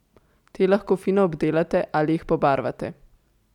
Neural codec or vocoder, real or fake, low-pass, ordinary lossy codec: none; real; 19.8 kHz; none